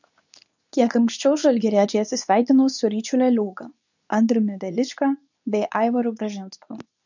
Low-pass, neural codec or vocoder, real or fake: 7.2 kHz; codec, 24 kHz, 0.9 kbps, WavTokenizer, medium speech release version 2; fake